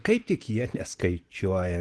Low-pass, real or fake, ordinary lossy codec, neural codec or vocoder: 10.8 kHz; real; Opus, 16 kbps; none